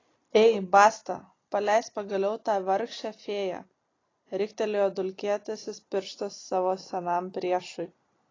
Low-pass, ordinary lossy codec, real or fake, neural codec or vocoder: 7.2 kHz; AAC, 32 kbps; real; none